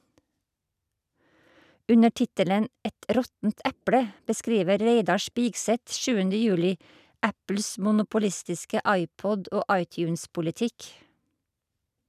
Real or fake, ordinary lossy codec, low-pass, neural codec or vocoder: real; none; 14.4 kHz; none